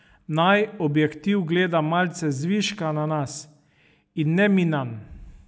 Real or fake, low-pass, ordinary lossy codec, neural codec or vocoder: real; none; none; none